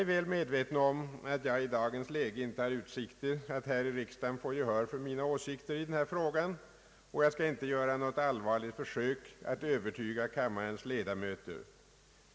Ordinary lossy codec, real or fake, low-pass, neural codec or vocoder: none; real; none; none